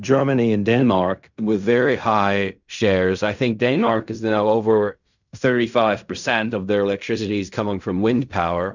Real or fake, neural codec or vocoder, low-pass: fake; codec, 16 kHz in and 24 kHz out, 0.4 kbps, LongCat-Audio-Codec, fine tuned four codebook decoder; 7.2 kHz